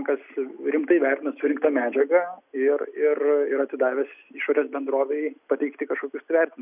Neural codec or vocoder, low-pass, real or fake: none; 3.6 kHz; real